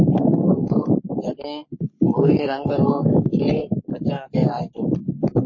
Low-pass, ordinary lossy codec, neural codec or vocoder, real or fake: 7.2 kHz; MP3, 32 kbps; codec, 44.1 kHz, 3.4 kbps, Pupu-Codec; fake